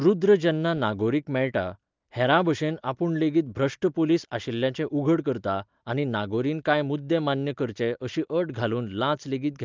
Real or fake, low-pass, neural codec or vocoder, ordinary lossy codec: real; 7.2 kHz; none; Opus, 32 kbps